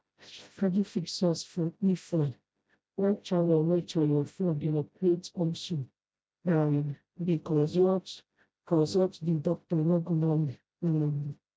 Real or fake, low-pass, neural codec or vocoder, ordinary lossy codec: fake; none; codec, 16 kHz, 0.5 kbps, FreqCodec, smaller model; none